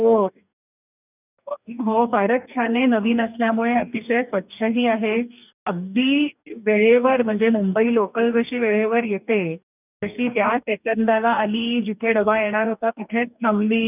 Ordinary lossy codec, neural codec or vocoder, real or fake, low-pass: none; codec, 44.1 kHz, 2.6 kbps, DAC; fake; 3.6 kHz